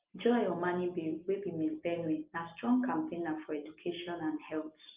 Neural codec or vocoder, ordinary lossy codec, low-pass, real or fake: none; Opus, 32 kbps; 3.6 kHz; real